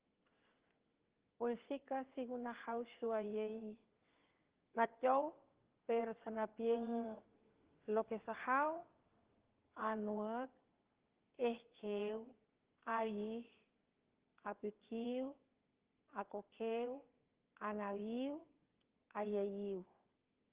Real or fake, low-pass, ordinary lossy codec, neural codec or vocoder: fake; 3.6 kHz; Opus, 16 kbps; vocoder, 22.05 kHz, 80 mel bands, WaveNeXt